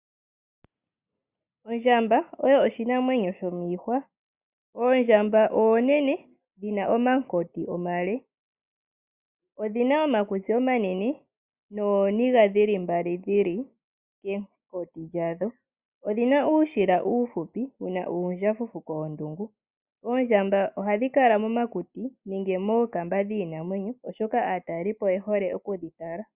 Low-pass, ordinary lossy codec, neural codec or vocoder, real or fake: 3.6 kHz; Opus, 64 kbps; none; real